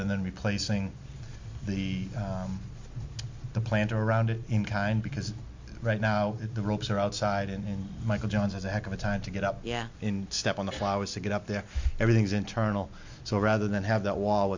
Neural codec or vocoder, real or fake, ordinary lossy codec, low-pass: none; real; MP3, 48 kbps; 7.2 kHz